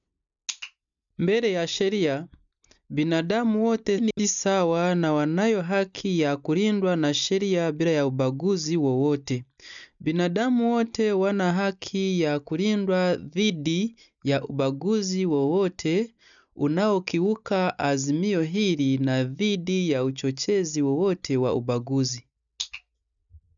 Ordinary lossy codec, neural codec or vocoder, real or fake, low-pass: none; none; real; 7.2 kHz